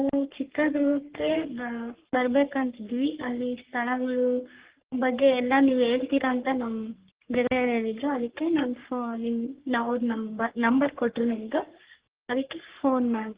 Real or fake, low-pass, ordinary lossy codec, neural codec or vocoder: fake; 3.6 kHz; Opus, 16 kbps; codec, 44.1 kHz, 3.4 kbps, Pupu-Codec